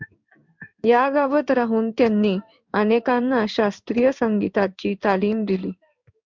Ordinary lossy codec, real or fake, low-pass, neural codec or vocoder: MP3, 64 kbps; fake; 7.2 kHz; codec, 16 kHz in and 24 kHz out, 1 kbps, XY-Tokenizer